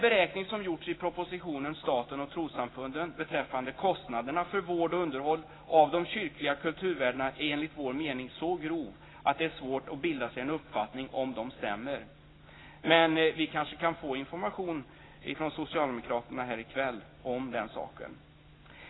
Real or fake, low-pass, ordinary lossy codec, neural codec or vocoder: real; 7.2 kHz; AAC, 16 kbps; none